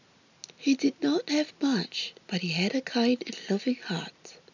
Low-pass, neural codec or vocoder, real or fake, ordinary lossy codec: 7.2 kHz; none; real; none